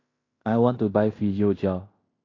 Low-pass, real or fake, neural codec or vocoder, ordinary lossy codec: 7.2 kHz; fake; codec, 16 kHz in and 24 kHz out, 0.9 kbps, LongCat-Audio-Codec, fine tuned four codebook decoder; AAC, 32 kbps